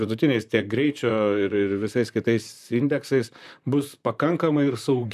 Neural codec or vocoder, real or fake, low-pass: vocoder, 44.1 kHz, 128 mel bands, Pupu-Vocoder; fake; 14.4 kHz